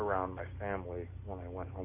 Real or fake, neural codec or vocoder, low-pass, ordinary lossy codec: real; none; 3.6 kHz; MP3, 24 kbps